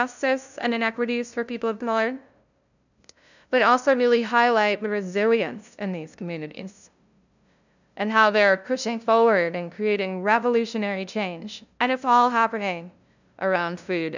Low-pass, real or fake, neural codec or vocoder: 7.2 kHz; fake; codec, 16 kHz, 0.5 kbps, FunCodec, trained on LibriTTS, 25 frames a second